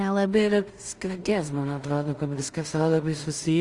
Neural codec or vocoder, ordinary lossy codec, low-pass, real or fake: codec, 16 kHz in and 24 kHz out, 0.4 kbps, LongCat-Audio-Codec, two codebook decoder; Opus, 32 kbps; 10.8 kHz; fake